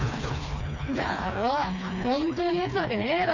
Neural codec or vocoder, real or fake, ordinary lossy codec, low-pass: codec, 16 kHz, 2 kbps, FreqCodec, smaller model; fake; none; 7.2 kHz